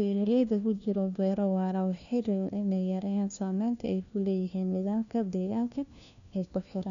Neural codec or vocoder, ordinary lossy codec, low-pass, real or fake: codec, 16 kHz, 1 kbps, FunCodec, trained on LibriTTS, 50 frames a second; none; 7.2 kHz; fake